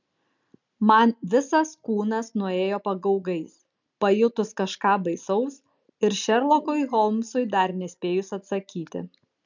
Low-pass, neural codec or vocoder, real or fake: 7.2 kHz; none; real